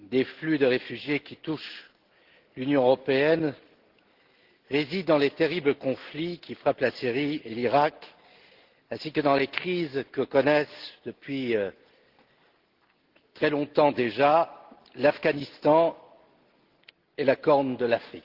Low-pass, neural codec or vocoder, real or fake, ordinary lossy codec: 5.4 kHz; none; real; Opus, 16 kbps